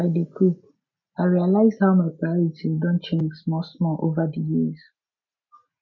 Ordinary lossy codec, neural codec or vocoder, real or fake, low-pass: MP3, 64 kbps; none; real; 7.2 kHz